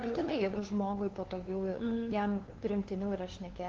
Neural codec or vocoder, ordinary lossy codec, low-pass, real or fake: codec, 16 kHz, 2 kbps, FunCodec, trained on LibriTTS, 25 frames a second; Opus, 16 kbps; 7.2 kHz; fake